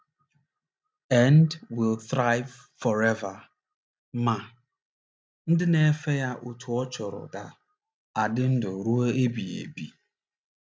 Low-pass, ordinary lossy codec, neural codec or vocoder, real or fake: none; none; none; real